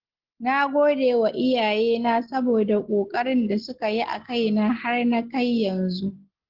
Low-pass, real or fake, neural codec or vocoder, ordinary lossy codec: 5.4 kHz; real; none; Opus, 16 kbps